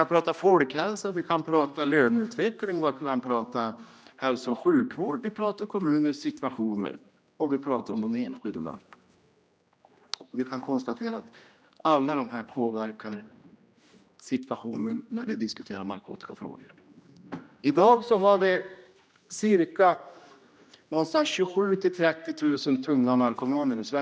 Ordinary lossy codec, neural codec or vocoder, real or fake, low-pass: none; codec, 16 kHz, 1 kbps, X-Codec, HuBERT features, trained on general audio; fake; none